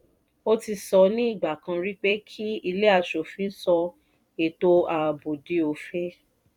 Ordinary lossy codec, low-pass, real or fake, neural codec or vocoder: none; 19.8 kHz; fake; vocoder, 44.1 kHz, 128 mel bands every 256 samples, BigVGAN v2